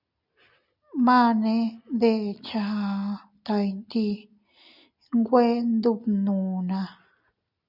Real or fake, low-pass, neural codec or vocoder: real; 5.4 kHz; none